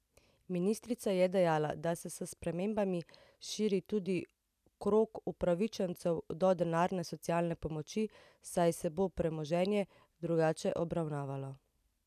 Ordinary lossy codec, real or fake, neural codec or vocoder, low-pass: none; real; none; 14.4 kHz